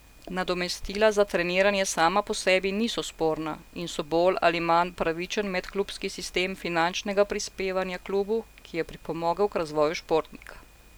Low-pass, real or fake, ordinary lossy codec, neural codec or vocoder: none; real; none; none